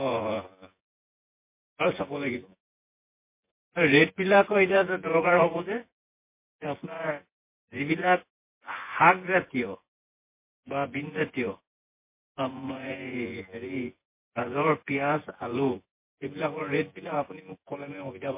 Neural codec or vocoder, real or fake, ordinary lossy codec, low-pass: vocoder, 24 kHz, 100 mel bands, Vocos; fake; MP3, 24 kbps; 3.6 kHz